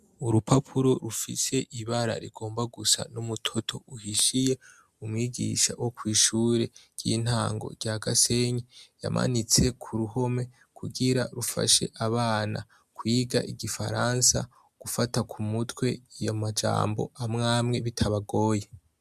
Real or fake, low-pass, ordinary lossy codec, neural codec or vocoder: real; 14.4 kHz; MP3, 96 kbps; none